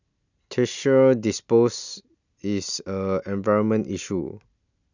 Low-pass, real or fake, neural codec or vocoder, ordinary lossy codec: 7.2 kHz; real; none; none